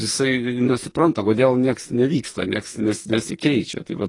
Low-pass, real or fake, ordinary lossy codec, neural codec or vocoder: 10.8 kHz; fake; AAC, 32 kbps; codec, 44.1 kHz, 2.6 kbps, SNAC